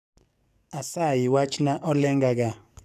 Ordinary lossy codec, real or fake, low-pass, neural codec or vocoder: none; fake; 14.4 kHz; codec, 44.1 kHz, 7.8 kbps, DAC